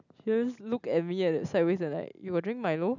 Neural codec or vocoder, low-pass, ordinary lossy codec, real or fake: none; 7.2 kHz; none; real